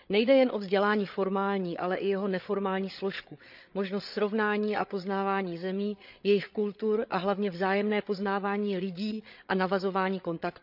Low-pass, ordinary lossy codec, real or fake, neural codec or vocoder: 5.4 kHz; none; fake; codec, 16 kHz, 8 kbps, FreqCodec, larger model